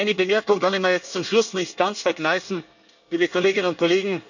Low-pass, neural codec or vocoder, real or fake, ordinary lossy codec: 7.2 kHz; codec, 24 kHz, 1 kbps, SNAC; fake; none